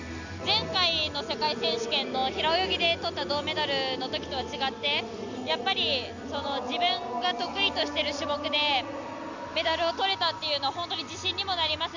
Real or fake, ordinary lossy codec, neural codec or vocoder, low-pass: real; Opus, 64 kbps; none; 7.2 kHz